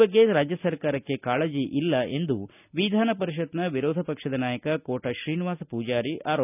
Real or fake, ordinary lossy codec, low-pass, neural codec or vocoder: real; none; 3.6 kHz; none